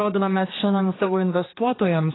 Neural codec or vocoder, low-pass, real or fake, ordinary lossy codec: codec, 16 kHz, 2 kbps, X-Codec, HuBERT features, trained on general audio; 7.2 kHz; fake; AAC, 16 kbps